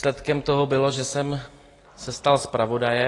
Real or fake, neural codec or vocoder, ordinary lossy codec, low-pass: real; none; AAC, 32 kbps; 10.8 kHz